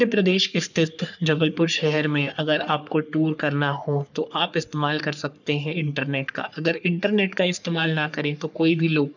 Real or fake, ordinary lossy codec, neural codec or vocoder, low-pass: fake; none; codec, 44.1 kHz, 3.4 kbps, Pupu-Codec; 7.2 kHz